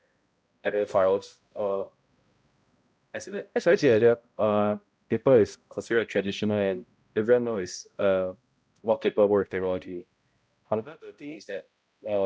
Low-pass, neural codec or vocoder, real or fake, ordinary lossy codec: none; codec, 16 kHz, 0.5 kbps, X-Codec, HuBERT features, trained on balanced general audio; fake; none